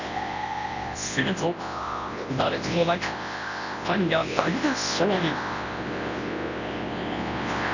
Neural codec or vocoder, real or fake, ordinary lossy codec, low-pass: codec, 24 kHz, 0.9 kbps, WavTokenizer, large speech release; fake; none; 7.2 kHz